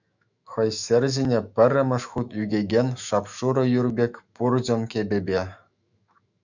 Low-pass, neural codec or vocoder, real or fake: 7.2 kHz; autoencoder, 48 kHz, 128 numbers a frame, DAC-VAE, trained on Japanese speech; fake